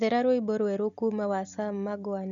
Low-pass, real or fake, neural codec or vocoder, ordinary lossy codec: 7.2 kHz; real; none; none